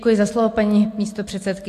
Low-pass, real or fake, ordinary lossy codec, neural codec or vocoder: 14.4 kHz; real; AAC, 64 kbps; none